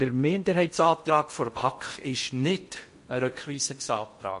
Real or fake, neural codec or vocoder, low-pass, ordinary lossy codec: fake; codec, 16 kHz in and 24 kHz out, 0.8 kbps, FocalCodec, streaming, 65536 codes; 10.8 kHz; MP3, 48 kbps